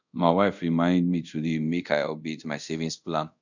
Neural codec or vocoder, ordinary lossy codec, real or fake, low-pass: codec, 24 kHz, 0.5 kbps, DualCodec; none; fake; 7.2 kHz